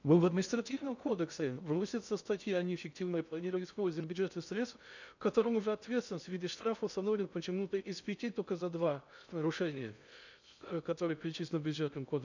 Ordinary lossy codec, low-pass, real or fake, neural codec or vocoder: none; 7.2 kHz; fake; codec, 16 kHz in and 24 kHz out, 0.6 kbps, FocalCodec, streaming, 2048 codes